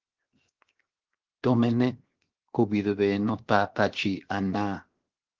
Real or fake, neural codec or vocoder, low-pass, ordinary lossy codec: fake; codec, 16 kHz, 0.7 kbps, FocalCodec; 7.2 kHz; Opus, 16 kbps